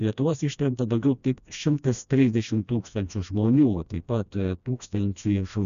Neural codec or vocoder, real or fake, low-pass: codec, 16 kHz, 1 kbps, FreqCodec, smaller model; fake; 7.2 kHz